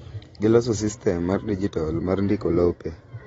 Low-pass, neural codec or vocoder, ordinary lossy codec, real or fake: 19.8 kHz; vocoder, 44.1 kHz, 128 mel bands every 512 samples, BigVGAN v2; AAC, 24 kbps; fake